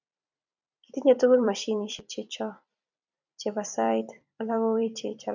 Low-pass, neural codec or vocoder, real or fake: 7.2 kHz; vocoder, 44.1 kHz, 128 mel bands every 256 samples, BigVGAN v2; fake